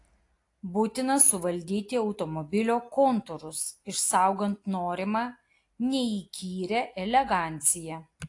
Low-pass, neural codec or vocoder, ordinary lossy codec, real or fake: 10.8 kHz; none; AAC, 48 kbps; real